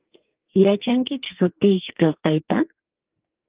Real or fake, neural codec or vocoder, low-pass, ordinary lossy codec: fake; codec, 44.1 kHz, 2.6 kbps, SNAC; 3.6 kHz; Opus, 24 kbps